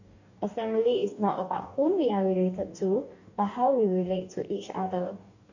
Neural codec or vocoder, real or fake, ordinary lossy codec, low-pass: codec, 44.1 kHz, 2.6 kbps, DAC; fake; none; 7.2 kHz